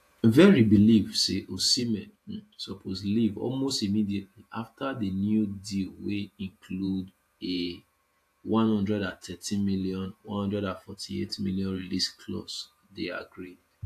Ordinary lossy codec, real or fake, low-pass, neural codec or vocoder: AAC, 64 kbps; fake; 14.4 kHz; vocoder, 48 kHz, 128 mel bands, Vocos